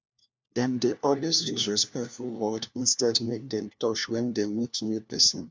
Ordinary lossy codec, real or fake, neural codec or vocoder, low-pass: none; fake; codec, 16 kHz, 1 kbps, FunCodec, trained on LibriTTS, 50 frames a second; none